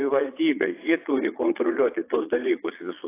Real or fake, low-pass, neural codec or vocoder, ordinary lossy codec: fake; 3.6 kHz; vocoder, 22.05 kHz, 80 mel bands, Vocos; AAC, 24 kbps